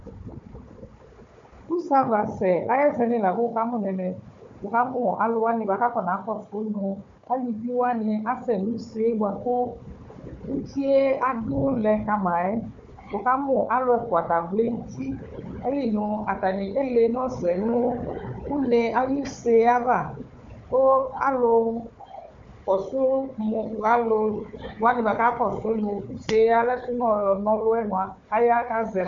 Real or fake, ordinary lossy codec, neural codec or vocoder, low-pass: fake; MP3, 48 kbps; codec, 16 kHz, 4 kbps, FunCodec, trained on Chinese and English, 50 frames a second; 7.2 kHz